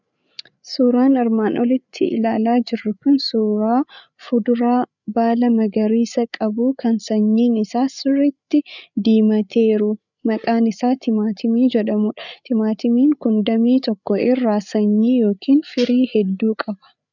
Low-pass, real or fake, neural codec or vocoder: 7.2 kHz; fake; codec, 16 kHz, 8 kbps, FreqCodec, larger model